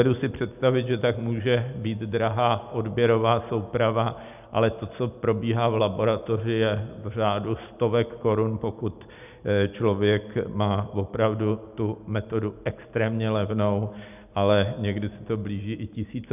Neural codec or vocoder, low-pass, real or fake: none; 3.6 kHz; real